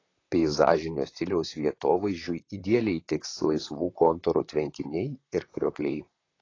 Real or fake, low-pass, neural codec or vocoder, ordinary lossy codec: fake; 7.2 kHz; vocoder, 44.1 kHz, 128 mel bands, Pupu-Vocoder; AAC, 32 kbps